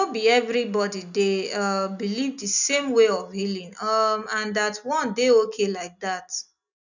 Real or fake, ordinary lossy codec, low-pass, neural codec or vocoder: real; none; 7.2 kHz; none